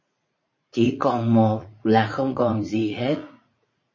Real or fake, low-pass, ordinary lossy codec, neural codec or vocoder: fake; 7.2 kHz; MP3, 32 kbps; vocoder, 44.1 kHz, 80 mel bands, Vocos